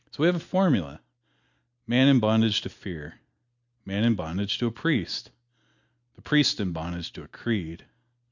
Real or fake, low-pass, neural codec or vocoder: real; 7.2 kHz; none